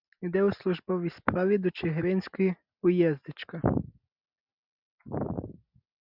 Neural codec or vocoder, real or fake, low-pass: none; real; 5.4 kHz